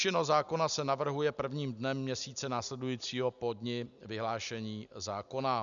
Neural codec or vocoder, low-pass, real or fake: none; 7.2 kHz; real